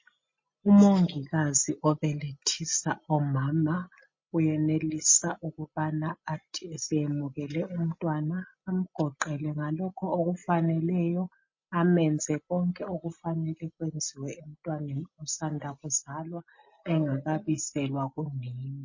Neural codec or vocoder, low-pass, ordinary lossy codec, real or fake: none; 7.2 kHz; MP3, 32 kbps; real